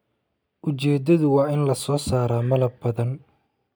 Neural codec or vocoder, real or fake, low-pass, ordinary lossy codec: none; real; none; none